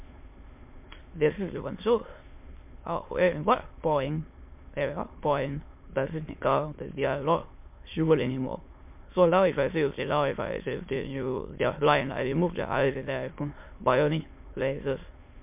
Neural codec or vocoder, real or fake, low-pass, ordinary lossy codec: autoencoder, 22.05 kHz, a latent of 192 numbers a frame, VITS, trained on many speakers; fake; 3.6 kHz; MP3, 32 kbps